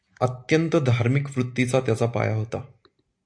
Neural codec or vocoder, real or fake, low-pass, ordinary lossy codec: none; real; 9.9 kHz; AAC, 64 kbps